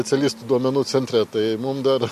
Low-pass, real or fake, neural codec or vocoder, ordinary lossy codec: 14.4 kHz; real; none; MP3, 64 kbps